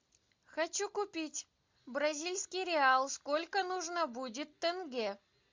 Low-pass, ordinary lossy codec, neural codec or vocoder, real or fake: 7.2 kHz; MP3, 64 kbps; none; real